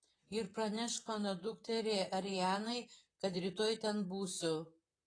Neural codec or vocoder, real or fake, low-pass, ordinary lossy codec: vocoder, 44.1 kHz, 128 mel bands, Pupu-Vocoder; fake; 9.9 kHz; AAC, 32 kbps